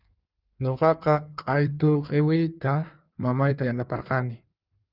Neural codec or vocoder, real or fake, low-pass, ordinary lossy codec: codec, 16 kHz in and 24 kHz out, 1.1 kbps, FireRedTTS-2 codec; fake; 5.4 kHz; Opus, 24 kbps